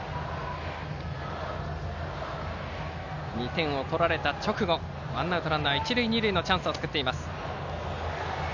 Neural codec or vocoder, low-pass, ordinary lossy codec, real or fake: none; 7.2 kHz; none; real